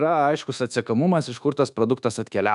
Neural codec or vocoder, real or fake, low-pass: codec, 24 kHz, 1.2 kbps, DualCodec; fake; 10.8 kHz